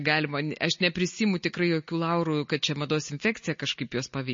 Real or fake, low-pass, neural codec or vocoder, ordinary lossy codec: real; 7.2 kHz; none; MP3, 32 kbps